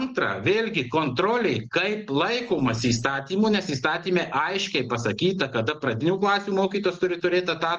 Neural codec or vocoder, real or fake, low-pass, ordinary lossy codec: none; real; 7.2 kHz; Opus, 24 kbps